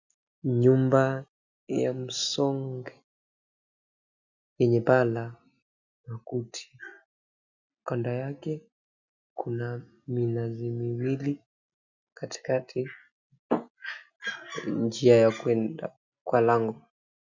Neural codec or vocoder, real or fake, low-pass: none; real; 7.2 kHz